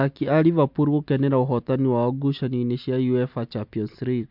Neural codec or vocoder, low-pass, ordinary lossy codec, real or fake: none; 5.4 kHz; MP3, 48 kbps; real